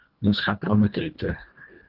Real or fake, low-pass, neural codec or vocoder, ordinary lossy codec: fake; 5.4 kHz; codec, 24 kHz, 1.5 kbps, HILCodec; Opus, 32 kbps